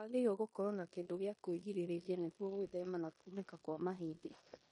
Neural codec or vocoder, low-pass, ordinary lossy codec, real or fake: codec, 16 kHz in and 24 kHz out, 0.9 kbps, LongCat-Audio-Codec, four codebook decoder; 10.8 kHz; MP3, 48 kbps; fake